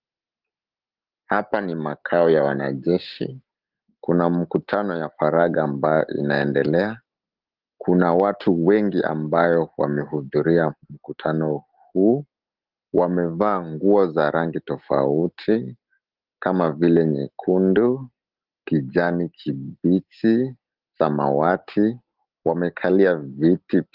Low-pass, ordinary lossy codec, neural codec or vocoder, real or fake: 5.4 kHz; Opus, 16 kbps; none; real